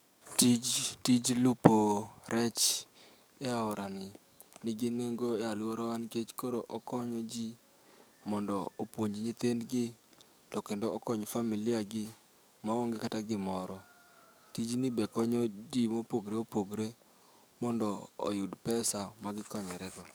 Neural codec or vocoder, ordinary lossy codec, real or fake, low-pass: codec, 44.1 kHz, 7.8 kbps, DAC; none; fake; none